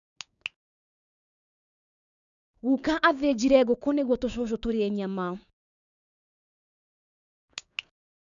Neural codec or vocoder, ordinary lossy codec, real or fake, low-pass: codec, 16 kHz, 6 kbps, DAC; none; fake; 7.2 kHz